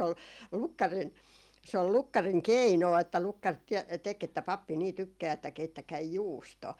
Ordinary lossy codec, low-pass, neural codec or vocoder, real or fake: Opus, 32 kbps; 19.8 kHz; none; real